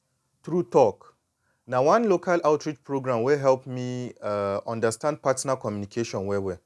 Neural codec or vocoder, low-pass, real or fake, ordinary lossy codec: none; none; real; none